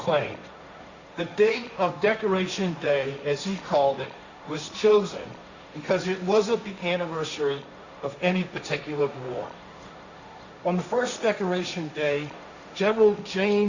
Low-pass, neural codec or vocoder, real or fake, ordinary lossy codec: 7.2 kHz; codec, 16 kHz, 1.1 kbps, Voila-Tokenizer; fake; Opus, 64 kbps